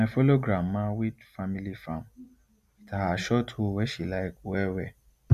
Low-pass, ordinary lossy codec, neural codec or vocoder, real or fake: 14.4 kHz; none; none; real